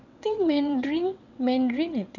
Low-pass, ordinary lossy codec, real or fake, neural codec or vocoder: 7.2 kHz; none; fake; vocoder, 22.05 kHz, 80 mel bands, WaveNeXt